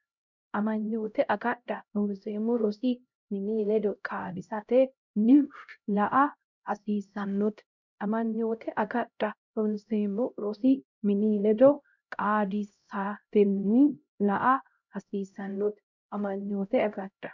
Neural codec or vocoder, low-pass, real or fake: codec, 16 kHz, 0.5 kbps, X-Codec, HuBERT features, trained on LibriSpeech; 7.2 kHz; fake